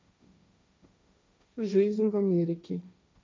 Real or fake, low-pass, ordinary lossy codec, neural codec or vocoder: fake; none; none; codec, 16 kHz, 1.1 kbps, Voila-Tokenizer